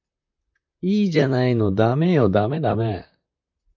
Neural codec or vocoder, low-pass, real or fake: vocoder, 44.1 kHz, 128 mel bands, Pupu-Vocoder; 7.2 kHz; fake